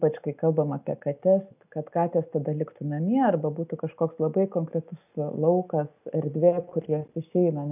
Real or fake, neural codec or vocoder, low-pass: real; none; 3.6 kHz